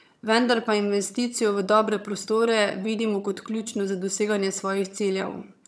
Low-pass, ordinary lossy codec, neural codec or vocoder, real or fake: none; none; vocoder, 22.05 kHz, 80 mel bands, HiFi-GAN; fake